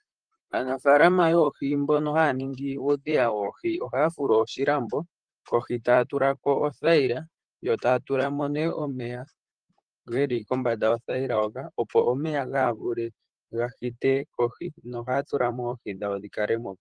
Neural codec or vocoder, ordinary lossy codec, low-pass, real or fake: vocoder, 44.1 kHz, 128 mel bands, Pupu-Vocoder; Opus, 24 kbps; 9.9 kHz; fake